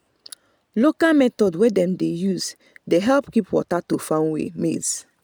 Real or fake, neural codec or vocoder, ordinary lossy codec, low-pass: real; none; none; none